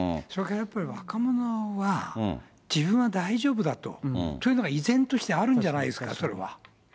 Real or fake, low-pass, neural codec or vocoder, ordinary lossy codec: real; none; none; none